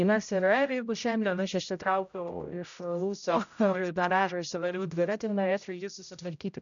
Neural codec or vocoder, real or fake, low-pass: codec, 16 kHz, 0.5 kbps, X-Codec, HuBERT features, trained on general audio; fake; 7.2 kHz